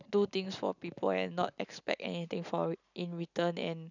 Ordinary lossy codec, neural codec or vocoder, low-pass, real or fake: none; none; 7.2 kHz; real